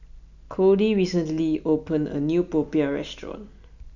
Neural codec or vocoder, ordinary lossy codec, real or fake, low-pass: none; none; real; 7.2 kHz